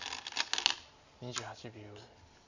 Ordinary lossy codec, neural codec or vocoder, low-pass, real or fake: none; none; 7.2 kHz; real